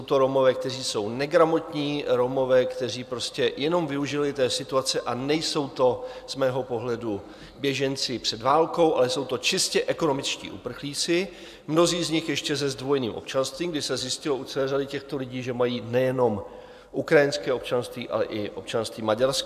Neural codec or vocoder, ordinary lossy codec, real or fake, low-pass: none; AAC, 96 kbps; real; 14.4 kHz